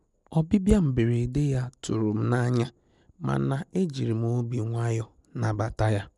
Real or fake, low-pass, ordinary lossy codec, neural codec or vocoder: real; 10.8 kHz; none; none